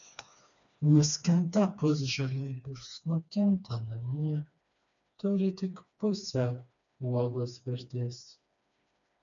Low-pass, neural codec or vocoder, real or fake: 7.2 kHz; codec, 16 kHz, 2 kbps, FreqCodec, smaller model; fake